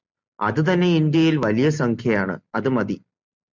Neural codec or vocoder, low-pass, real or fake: none; 7.2 kHz; real